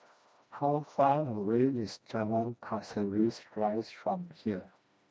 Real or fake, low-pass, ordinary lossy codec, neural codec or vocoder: fake; none; none; codec, 16 kHz, 1 kbps, FreqCodec, smaller model